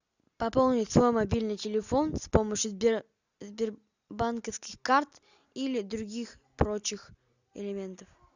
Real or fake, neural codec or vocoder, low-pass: real; none; 7.2 kHz